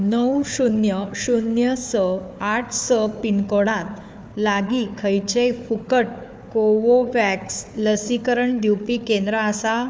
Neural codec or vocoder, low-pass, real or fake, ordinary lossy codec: codec, 16 kHz, 4 kbps, FunCodec, trained on Chinese and English, 50 frames a second; none; fake; none